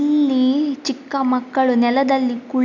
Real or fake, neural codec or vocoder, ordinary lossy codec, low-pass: real; none; none; 7.2 kHz